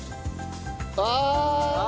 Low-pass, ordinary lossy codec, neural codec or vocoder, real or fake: none; none; none; real